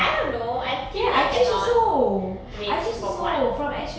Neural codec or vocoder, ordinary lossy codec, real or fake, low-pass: none; none; real; none